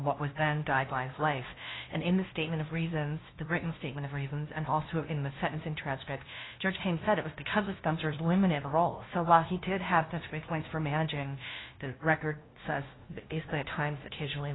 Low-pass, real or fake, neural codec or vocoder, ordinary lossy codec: 7.2 kHz; fake; codec, 16 kHz, 0.5 kbps, FunCodec, trained on LibriTTS, 25 frames a second; AAC, 16 kbps